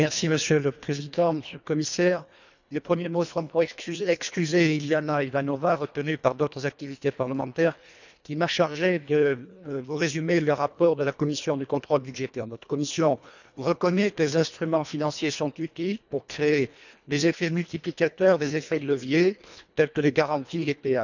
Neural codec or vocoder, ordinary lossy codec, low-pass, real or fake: codec, 24 kHz, 1.5 kbps, HILCodec; none; 7.2 kHz; fake